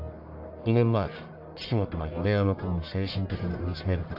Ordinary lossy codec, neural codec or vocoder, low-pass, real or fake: AAC, 48 kbps; codec, 44.1 kHz, 1.7 kbps, Pupu-Codec; 5.4 kHz; fake